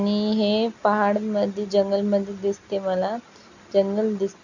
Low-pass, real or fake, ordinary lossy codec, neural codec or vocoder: 7.2 kHz; real; none; none